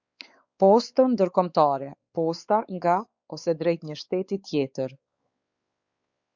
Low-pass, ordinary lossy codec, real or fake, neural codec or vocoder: 7.2 kHz; Opus, 64 kbps; fake; codec, 16 kHz, 4 kbps, X-Codec, WavLM features, trained on Multilingual LibriSpeech